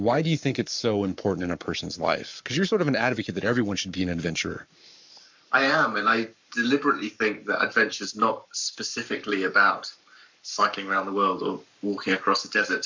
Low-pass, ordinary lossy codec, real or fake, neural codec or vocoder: 7.2 kHz; MP3, 64 kbps; fake; codec, 44.1 kHz, 7.8 kbps, Pupu-Codec